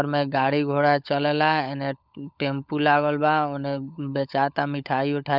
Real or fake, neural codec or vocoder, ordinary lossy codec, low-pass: fake; codec, 16 kHz, 16 kbps, FunCodec, trained on LibriTTS, 50 frames a second; none; 5.4 kHz